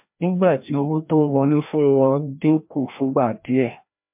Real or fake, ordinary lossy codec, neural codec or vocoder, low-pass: fake; MP3, 32 kbps; codec, 16 kHz, 1 kbps, FreqCodec, larger model; 3.6 kHz